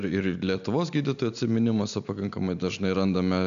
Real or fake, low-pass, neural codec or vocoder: real; 7.2 kHz; none